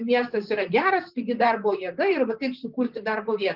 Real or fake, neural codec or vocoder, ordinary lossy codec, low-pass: fake; vocoder, 44.1 kHz, 128 mel bands, Pupu-Vocoder; Opus, 32 kbps; 5.4 kHz